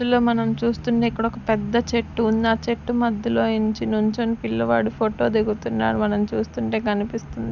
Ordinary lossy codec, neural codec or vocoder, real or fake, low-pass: none; none; real; 7.2 kHz